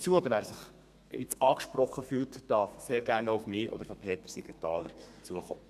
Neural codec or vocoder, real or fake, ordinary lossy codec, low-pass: codec, 32 kHz, 1.9 kbps, SNAC; fake; none; 14.4 kHz